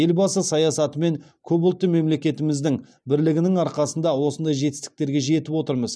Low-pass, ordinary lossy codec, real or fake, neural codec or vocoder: none; none; real; none